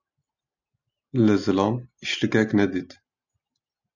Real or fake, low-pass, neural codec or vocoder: real; 7.2 kHz; none